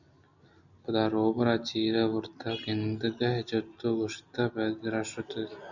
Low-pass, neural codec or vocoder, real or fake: 7.2 kHz; none; real